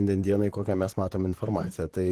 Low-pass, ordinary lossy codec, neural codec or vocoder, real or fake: 14.4 kHz; Opus, 16 kbps; vocoder, 44.1 kHz, 128 mel bands, Pupu-Vocoder; fake